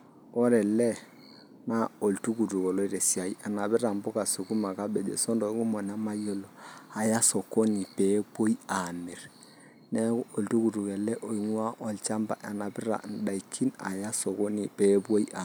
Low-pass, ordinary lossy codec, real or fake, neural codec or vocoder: none; none; real; none